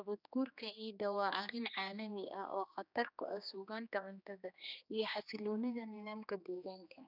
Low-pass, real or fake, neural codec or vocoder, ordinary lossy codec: 5.4 kHz; fake; codec, 16 kHz, 2 kbps, X-Codec, HuBERT features, trained on general audio; none